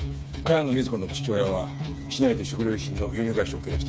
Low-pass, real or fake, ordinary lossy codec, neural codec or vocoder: none; fake; none; codec, 16 kHz, 4 kbps, FreqCodec, smaller model